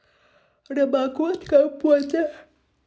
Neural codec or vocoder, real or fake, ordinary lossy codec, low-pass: none; real; none; none